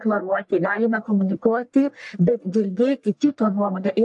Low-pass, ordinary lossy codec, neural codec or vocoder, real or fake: 10.8 kHz; AAC, 64 kbps; codec, 44.1 kHz, 1.7 kbps, Pupu-Codec; fake